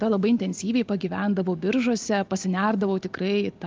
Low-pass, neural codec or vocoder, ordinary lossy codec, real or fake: 7.2 kHz; none; Opus, 24 kbps; real